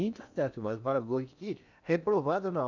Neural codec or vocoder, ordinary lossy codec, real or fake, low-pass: codec, 16 kHz in and 24 kHz out, 0.8 kbps, FocalCodec, streaming, 65536 codes; none; fake; 7.2 kHz